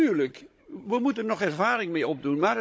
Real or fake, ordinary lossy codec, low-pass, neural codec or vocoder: fake; none; none; codec, 16 kHz, 16 kbps, FunCodec, trained on Chinese and English, 50 frames a second